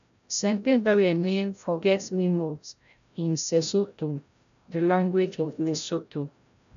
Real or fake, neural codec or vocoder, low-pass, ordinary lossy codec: fake; codec, 16 kHz, 0.5 kbps, FreqCodec, larger model; 7.2 kHz; none